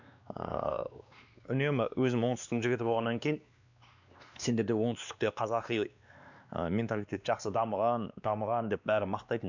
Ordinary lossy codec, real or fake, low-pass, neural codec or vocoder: none; fake; 7.2 kHz; codec, 16 kHz, 2 kbps, X-Codec, WavLM features, trained on Multilingual LibriSpeech